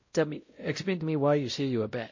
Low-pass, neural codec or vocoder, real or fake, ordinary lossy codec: 7.2 kHz; codec, 16 kHz, 0.5 kbps, X-Codec, WavLM features, trained on Multilingual LibriSpeech; fake; MP3, 32 kbps